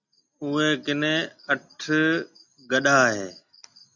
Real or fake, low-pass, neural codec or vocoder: real; 7.2 kHz; none